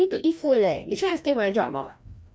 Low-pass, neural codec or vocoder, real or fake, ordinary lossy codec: none; codec, 16 kHz, 1 kbps, FreqCodec, larger model; fake; none